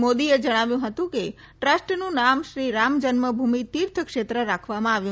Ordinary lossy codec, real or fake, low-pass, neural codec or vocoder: none; real; none; none